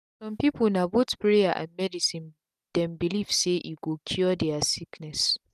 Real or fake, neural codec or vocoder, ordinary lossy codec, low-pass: real; none; none; 14.4 kHz